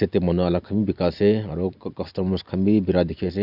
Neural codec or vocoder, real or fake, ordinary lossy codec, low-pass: none; real; none; 5.4 kHz